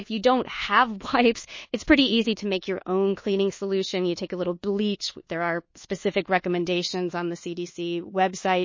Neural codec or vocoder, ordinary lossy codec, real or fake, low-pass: codec, 24 kHz, 1.2 kbps, DualCodec; MP3, 32 kbps; fake; 7.2 kHz